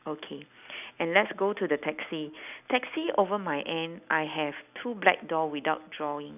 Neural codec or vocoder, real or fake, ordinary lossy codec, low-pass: none; real; none; 3.6 kHz